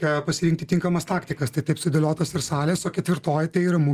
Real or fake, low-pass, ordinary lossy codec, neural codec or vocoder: real; 14.4 kHz; Opus, 32 kbps; none